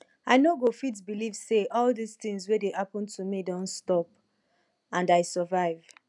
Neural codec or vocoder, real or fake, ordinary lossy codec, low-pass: none; real; none; 10.8 kHz